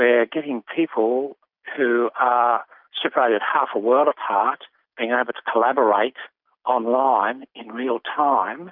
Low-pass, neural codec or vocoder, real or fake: 5.4 kHz; none; real